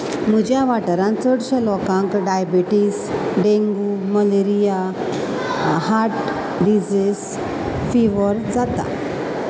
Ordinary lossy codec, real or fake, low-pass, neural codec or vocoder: none; real; none; none